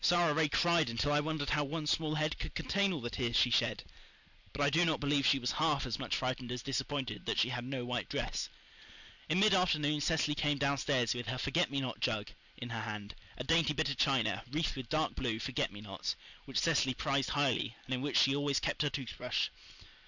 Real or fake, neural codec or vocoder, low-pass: real; none; 7.2 kHz